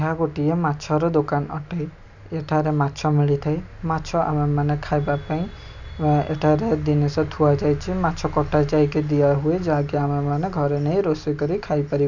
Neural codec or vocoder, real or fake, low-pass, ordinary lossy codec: none; real; 7.2 kHz; none